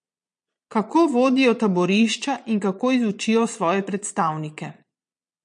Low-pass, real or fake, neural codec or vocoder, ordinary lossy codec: 9.9 kHz; fake; vocoder, 22.05 kHz, 80 mel bands, Vocos; MP3, 48 kbps